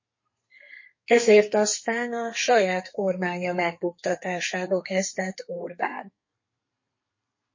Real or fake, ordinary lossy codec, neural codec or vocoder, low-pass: fake; MP3, 32 kbps; codec, 32 kHz, 1.9 kbps, SNAC; 7.2 kHz